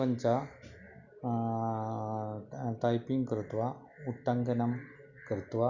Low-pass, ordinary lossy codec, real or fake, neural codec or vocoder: 7.2 kHz; none; real; none